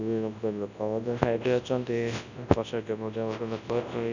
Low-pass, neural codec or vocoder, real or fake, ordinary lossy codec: 7.2 kHz; codec, 24 kHz, 0.9 kbps, WavTokenizer, large speech release; fake; none